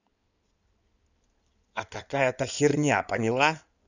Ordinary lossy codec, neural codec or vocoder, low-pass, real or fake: none; codec, 16 kHz in and 24 kHz out, 2.2 kbps, FireRedTTS-2 codec; 7.2 kHz; fake